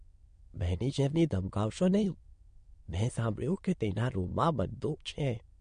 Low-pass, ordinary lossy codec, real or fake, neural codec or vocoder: 9.9 kHz; MP3, 48 kbps; fake; autoencoder, 22.05 kHz, a latent of 192 numbers a frame, VITS, trained on many speakers